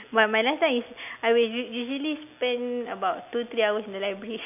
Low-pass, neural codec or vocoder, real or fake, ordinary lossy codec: 3.6 kHz; none; real; none